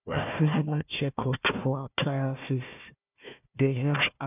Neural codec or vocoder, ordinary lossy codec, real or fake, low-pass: codec, 16 kHz, 1 kbps, FunCodec, trained on Chinese and English, 50 frames a second; none; fake; 3.6 kHz